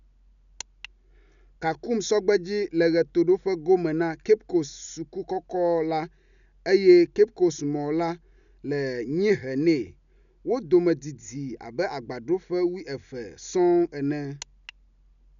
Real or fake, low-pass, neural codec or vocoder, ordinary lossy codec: real; 7.2 kHz; none; none